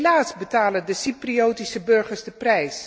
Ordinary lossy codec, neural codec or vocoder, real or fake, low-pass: none; none; real; none